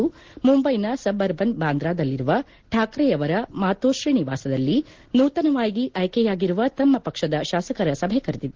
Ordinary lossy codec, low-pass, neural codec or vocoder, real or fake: Opus, 16 kbps; 7.2 kHz; none; real